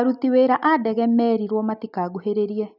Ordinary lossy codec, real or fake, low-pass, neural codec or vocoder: none; real; 5.4 kHz; none